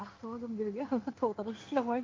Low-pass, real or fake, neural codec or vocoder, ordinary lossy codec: 7.2 kHz; fake; codec, 16 kHz in and 24 kHz out, 1 kbps, XY-Tokenizer; Opus, 32 kbps